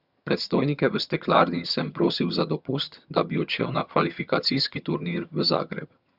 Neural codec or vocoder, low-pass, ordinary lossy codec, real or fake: vocoder, 22.05 kHz, 80 mel bands, HiFi-GAN; 5.4 kHz; Opus, 64 kbps; fake